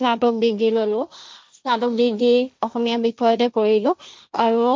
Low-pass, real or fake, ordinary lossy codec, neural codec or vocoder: none; fake; none; codec, 16 kHz, 1.1 kbps, Voila-Tokenizer